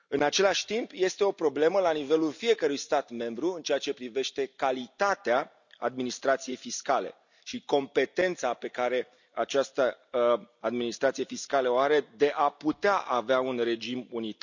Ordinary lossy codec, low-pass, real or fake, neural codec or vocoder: none; 7.2 kHz; real; none